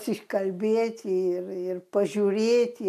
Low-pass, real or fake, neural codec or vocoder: 14.4 kHz; real; none